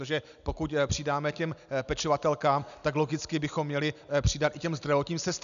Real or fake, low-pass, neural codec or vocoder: real; 7.2 kHz; none